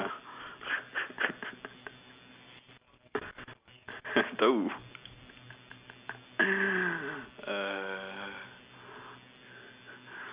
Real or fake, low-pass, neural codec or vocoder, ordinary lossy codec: real; 3.6 kHz; none; Opus, 64 kbps